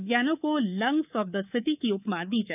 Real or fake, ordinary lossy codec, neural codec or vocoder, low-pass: fake; none; codec, 24 kHz, 3.1 kbps, DualCodec; 3.6 kHz